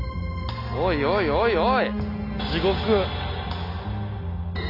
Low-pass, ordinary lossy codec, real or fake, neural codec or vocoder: 5.4 kHz; none; real; none